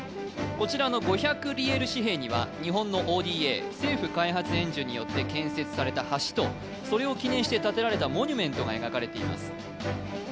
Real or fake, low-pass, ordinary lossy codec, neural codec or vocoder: real; none; none; none